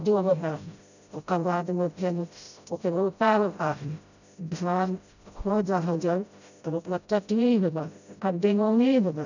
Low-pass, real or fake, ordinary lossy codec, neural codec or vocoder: 7.2 kHz; fake; none; codec, 16 kHz, 0.5 kbps, FreqCodec, smaller model